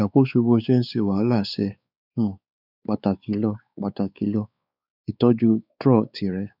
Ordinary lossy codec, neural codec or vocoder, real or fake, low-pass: none; codec, 16 kHz, 4 kbps, X-Codec, WavLM features, trained on Multilingual LibriSpeech; fake; 5.4 kHz